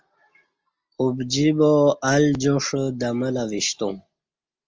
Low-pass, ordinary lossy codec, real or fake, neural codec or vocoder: 7.2 kHz; Opus, 24 kbps; real; none